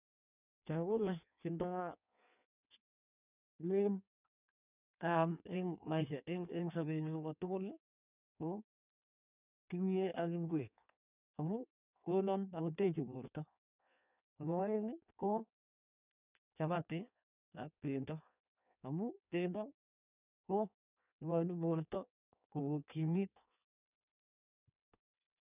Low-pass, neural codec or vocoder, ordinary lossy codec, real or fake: 3.6 kHz; codec, 16 kHz in and 24 kHz out, 1.1 kbps, FireRedTTS-2 codec; none; fake